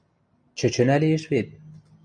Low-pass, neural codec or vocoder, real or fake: 9.9 kHz; none; real